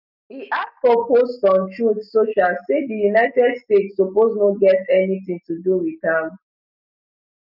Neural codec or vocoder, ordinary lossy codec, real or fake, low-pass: none; none; real; 5.4 kHz